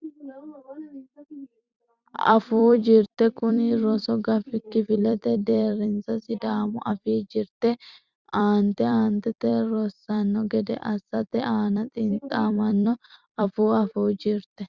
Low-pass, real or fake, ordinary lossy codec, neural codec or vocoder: 7.2 kHz; fake; Opus, 64 kbps; vocoder, 44.1 kHz, 128 mel bands every 256 samples, BigVGAN v2